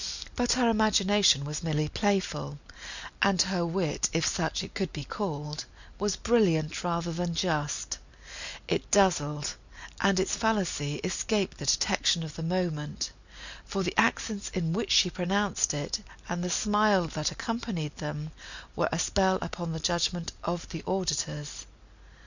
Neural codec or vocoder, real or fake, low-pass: none; real; 7.2 kHz